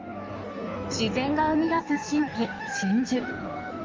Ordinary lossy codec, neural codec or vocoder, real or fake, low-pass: Opus, 32 kbps; codec, 16 kHz in and 24 kHz out, 1.1 kbps, FireRedTTS-2 codec; fake; 7.2 kHz